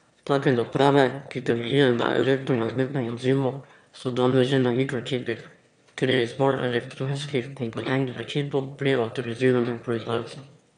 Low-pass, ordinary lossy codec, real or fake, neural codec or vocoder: 9.9 kHz; MP3, 96 kbps; fake; autoencoder, 22.05 kHz, a latent of 192 numbers a frame, VITS, trained on one speaker